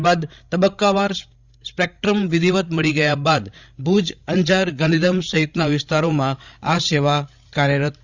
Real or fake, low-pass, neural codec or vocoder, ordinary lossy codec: fake; 7.2 kHz; codec, 16 kHz, 16 kbps, FreqCodec, larger model; Opus, 64 kbps